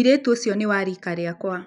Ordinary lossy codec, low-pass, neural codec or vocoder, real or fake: none; 10.8 kHz; none; real